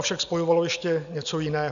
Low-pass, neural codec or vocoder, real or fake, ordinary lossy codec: 7.2 kHz; none; real; AAC, 96 kbps